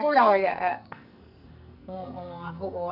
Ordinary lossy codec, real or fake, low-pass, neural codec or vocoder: none; fake; 5.4 kHz; codec, 44.1 kHz, 2.6 kbps, SNAC